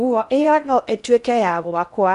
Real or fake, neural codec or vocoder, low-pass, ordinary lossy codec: fake; codec, 16 kHz in and 24 kHz out, 0.6 kbps, FocalCodec, streaming, 4096 codes; 10.8 kHz; none